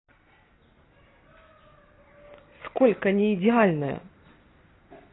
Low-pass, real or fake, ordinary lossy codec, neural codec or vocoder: 7.2 kHz; real; AAC, 16 kbps; none